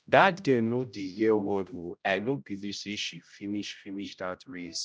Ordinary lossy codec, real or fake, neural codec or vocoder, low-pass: none; fake; codec, 16 kHz, 0.5 kbps, X-Codec, HuBERT features, trained on general audio; none